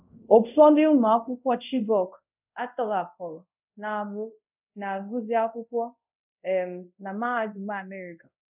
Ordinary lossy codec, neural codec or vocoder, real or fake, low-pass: none; codec, 24 kHz, 0.5 kbps, DualCodec; fake; 3.6 kHz